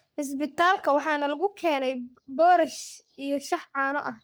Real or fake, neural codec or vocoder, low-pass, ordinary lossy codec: fake; codec, 44.1 kHz, 3.4 kbps, Pupu-Codec; none; none